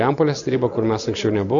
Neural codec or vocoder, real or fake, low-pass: none; real; 7.2 kHz